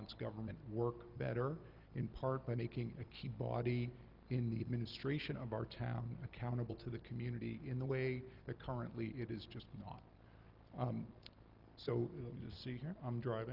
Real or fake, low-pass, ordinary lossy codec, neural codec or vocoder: real; 5.4 kHz; Opus, 32 kbps; none